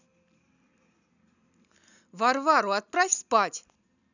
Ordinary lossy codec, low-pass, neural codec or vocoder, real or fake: none; 7.2 kHz; none; real